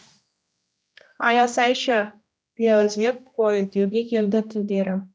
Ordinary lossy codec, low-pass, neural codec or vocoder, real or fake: none; none; codec, 16 kHz, 1 kbps, X-Codec, HuBERT features, trained on general audio; fake